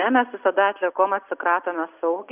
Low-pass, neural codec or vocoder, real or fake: 3.6 kHz; none; real